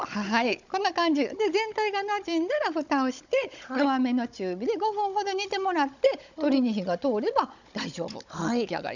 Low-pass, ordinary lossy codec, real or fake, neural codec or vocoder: 7.2 kHz; none; fake; codec, 16 kHz, 16 kbps, FunCodec, trained on Chinese and English, 50 frames a second